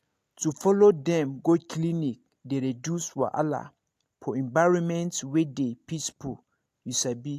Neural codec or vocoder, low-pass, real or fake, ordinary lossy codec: none; 14.4 kHz; real; AAC, 64 kbps